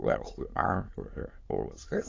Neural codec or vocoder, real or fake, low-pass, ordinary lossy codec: autoencoder, 22.05 kHz, a latent of 192 numbers a frame, VITS, trained on many speakers; fake; 7.2 kHz; AAC, 32 kbps